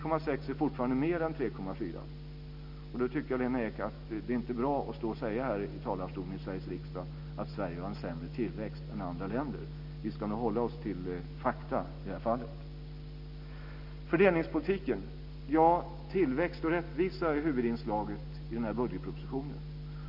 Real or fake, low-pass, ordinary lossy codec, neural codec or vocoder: real; 5.4 kHz; none; none